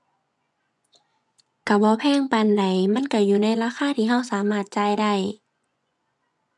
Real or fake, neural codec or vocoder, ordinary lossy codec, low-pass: fake; vocoder, 24 kHz, 100 mel bands, Vocos; none; none